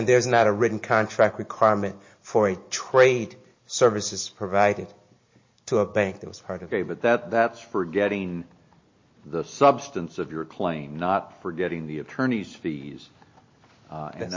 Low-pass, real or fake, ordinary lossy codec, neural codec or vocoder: 7.2 kHz; real; MP3, 32 kbps; none